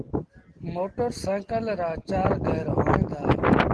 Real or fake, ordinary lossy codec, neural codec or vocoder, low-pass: real; Opus, 16 kbps; none; 10.8 kHz